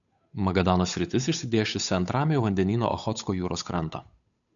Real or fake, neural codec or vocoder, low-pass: fake; codec, 16 kHz, 8 kbps, FunCodec, trained on Chinese and English, 25 frames a second; 7.2 kHz